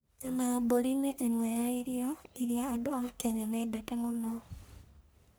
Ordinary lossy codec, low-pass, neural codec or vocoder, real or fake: none; none; codec, 44.1 kHz, 1.7 kbps, Pupu-Codec; fake